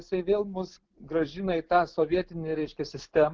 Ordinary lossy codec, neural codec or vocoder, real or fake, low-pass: Opus, 16 kbps; none; real; 7.2 kHz